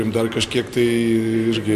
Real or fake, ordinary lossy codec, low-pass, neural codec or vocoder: real; MP3, 64 kbps; 14.4 kHz; none